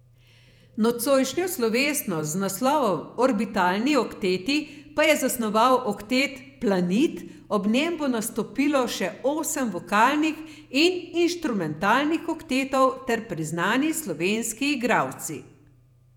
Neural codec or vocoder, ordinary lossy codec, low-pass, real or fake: vocoder, 48 kHz, 128 mel bands, Vocos; none; 19.8 kHz; fake